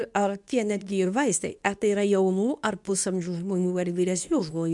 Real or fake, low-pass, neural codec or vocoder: fake; 10.8 kHz; codec, 24 kHz, 0.9 kbps, WavTokenizer, medium speech release version 2